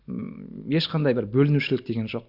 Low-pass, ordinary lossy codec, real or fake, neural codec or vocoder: 5.4 kHz; Opus, 64 kbps; real; none